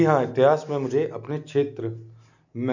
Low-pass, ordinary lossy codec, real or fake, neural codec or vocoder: 7.2 kHz; none; real; none